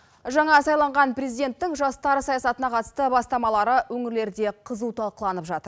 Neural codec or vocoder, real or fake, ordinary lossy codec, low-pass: none; real; none; none